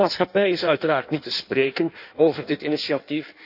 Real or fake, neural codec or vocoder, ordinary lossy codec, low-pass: fake; codec, 16 kHz in and 24 kHz out, 1.1 kbps, FireRedTTS-2 codec; none; 5.4 kHz